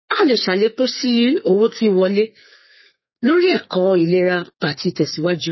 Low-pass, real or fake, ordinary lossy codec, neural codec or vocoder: 7.2 kHz; fake; MP3, 24 kbps; codec, 32 kHz, 1.9 kbps, SNAC